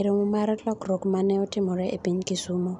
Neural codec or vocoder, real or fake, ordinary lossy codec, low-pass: none; real; none; 10.8 kHz